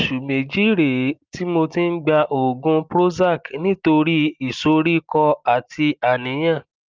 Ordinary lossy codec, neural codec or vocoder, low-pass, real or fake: Opus, 24 kbps; none; 7.2 kHz; real